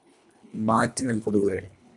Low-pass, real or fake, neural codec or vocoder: 10.8 kHz; fake; codec, 24 kHz, 1.5 kbps, HILCodec